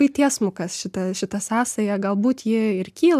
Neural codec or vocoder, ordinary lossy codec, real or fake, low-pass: none; MP3, 96 kbps; real; 14.4 kHz